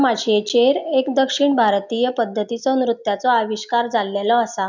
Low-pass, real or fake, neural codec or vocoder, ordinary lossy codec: 7.2 kHz; real; none; none